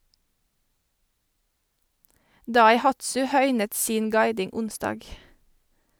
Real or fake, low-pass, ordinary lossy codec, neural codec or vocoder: real; none; none; none